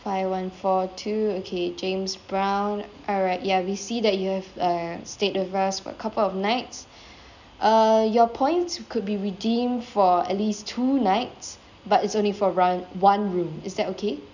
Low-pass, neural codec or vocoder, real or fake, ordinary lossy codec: 7.2 kHz; none; real; none